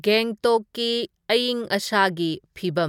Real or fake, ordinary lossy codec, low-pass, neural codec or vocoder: real; MP3, 96 kbps; 19.8 kHz; none